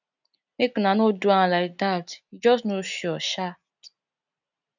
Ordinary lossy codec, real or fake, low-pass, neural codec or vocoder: none; real; 7.2 kHz; none